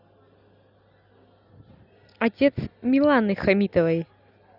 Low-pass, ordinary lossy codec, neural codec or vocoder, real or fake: 5.4 kHz; none; none; real